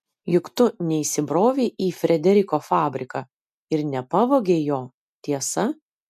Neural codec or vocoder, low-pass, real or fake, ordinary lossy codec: none; 14.4 kHz; real; MP3, 96 kbps